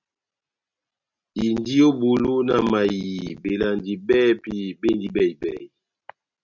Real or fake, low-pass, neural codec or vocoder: real; 7.2 kHz; none